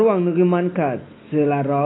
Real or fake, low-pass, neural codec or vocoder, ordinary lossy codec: real; 7.2 kHz; none; AAC, 16 kbps